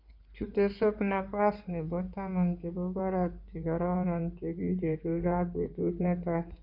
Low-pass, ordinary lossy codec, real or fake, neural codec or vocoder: 5.4 kHz; none; fake; codec, 16 kHz in and 24 kHz out, 2.2 kbps, FireRedTTS-2 codec